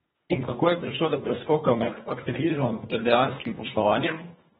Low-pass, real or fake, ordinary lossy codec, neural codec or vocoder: 10.8 kHz; fake; AAC, 16 kbps; codec, 24 kHz, 1.5 kbps, HILCodec